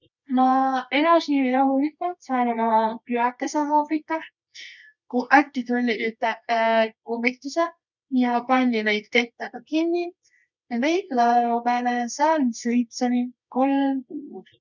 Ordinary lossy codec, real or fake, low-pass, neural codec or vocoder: none; fake; 7.2 kHz; codec, 24 kHz, 0.9 kbps, WavTokenizer, medium music audio release